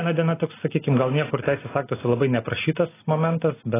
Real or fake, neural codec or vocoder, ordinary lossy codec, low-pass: real; none; AAC, 16 kbps; 3.6 kHz